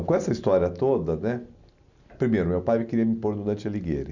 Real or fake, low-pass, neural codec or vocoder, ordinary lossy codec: real; 7.2 kHz; none; none